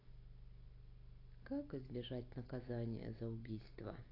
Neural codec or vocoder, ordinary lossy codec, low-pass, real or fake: none; AAC, 24 kbps; 5.4 kHz; real